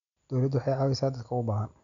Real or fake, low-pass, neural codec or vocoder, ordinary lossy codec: real; 7.2 kHz; none; none